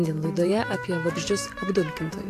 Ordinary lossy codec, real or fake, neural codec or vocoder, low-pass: AAC, 64 kbps; fake; vocoder, 44.1 kHz, 128 mel bands every 512 samples, BigVGAN v2; 14.4 kHz